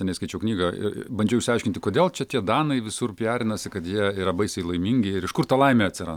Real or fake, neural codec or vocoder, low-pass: real; none; 19.8 kHz